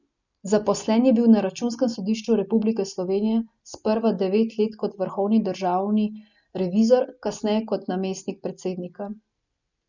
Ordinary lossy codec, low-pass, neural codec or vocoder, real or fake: none; 7.2 kHz; none; real